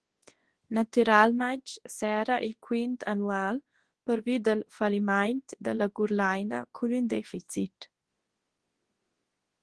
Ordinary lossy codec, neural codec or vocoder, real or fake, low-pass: Opus, 16 kbps; codec, 24 kHz, 0.9 kbps, WavTokenizer, large speech release; fake; 10.8 kHz